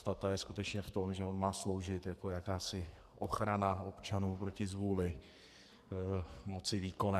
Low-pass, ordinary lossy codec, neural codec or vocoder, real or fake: 14.4 kHz; MP3, 96 kbps; codec, 44.1 kHz, 2.6 kbps, SNAC; fake